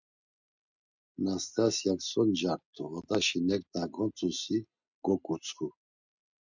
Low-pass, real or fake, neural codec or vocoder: 7.2 kHz; real; none